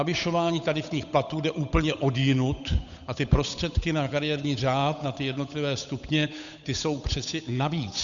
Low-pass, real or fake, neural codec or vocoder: 7.2 kHz; fake; codec, 16 kHz, 8 kbps, FunCodec, trained on Chinese and English, 25 frames a second